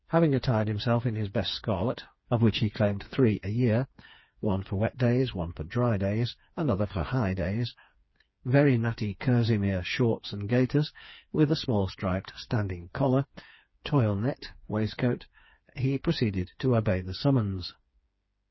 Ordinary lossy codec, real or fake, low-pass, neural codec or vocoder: MP3, 24 kbps; fake; 7.2 kHz; codec, 16 kHz, 4 kbps, FreqCodec, smaller model